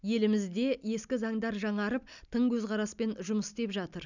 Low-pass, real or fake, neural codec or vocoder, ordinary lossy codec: 7.2 kHz; real; none; none